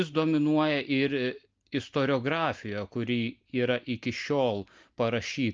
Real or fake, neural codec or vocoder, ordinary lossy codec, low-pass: real; none; Opus, 24 kbps; 7.2 kHz